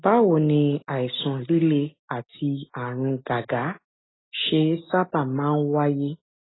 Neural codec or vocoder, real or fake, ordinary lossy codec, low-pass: none; real; AAC, 16 kbps; 7.2 kHz